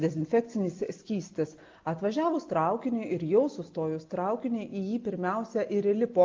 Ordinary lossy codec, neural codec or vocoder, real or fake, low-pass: Opus, 32 kbps; none; real; 7.2 kHz